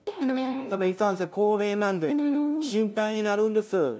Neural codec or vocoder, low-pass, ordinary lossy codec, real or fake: codec, 16 kHz, 0.5 kbps, FunCodec, trained on LibriTTS, 25 frames a second; none; none; fake